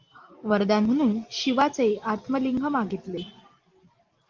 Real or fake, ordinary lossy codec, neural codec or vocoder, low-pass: real; Opus, 32 kbps; none; 7.2 kHz